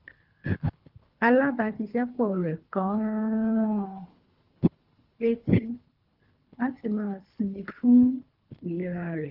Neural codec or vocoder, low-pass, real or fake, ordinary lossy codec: codec, 24 kHz, 3 kbps, HILCodec; 5.4 kHz; fake; Opus, 24 kbps